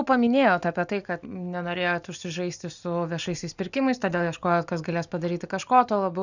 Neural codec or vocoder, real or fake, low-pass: none; real; 7.2 kHz